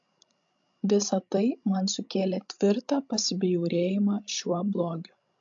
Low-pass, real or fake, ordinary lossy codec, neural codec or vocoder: 7.2 kHz; fake; AAC, 64 kbps; codec, 16 kHz, 16 kbps, FreqCodec, larger model